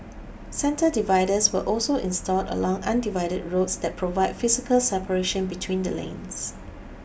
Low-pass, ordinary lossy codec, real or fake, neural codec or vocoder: none; none; real; none